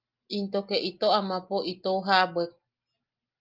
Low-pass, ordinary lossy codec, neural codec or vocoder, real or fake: 5.4 kHz; Opus, 24 kbps; none; real